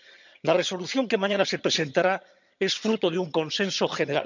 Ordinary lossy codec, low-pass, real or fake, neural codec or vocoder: none; 7.2 kHz; fake; vocoder, 22.05 kHz, 80 mel bands, HiFi-GAN